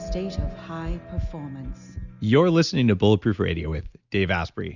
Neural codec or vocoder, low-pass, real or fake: none; 7.2 kHz; real